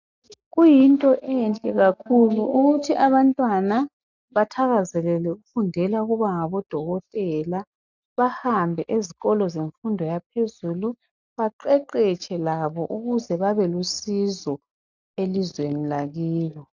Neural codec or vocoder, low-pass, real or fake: none; 7.2 kHz; real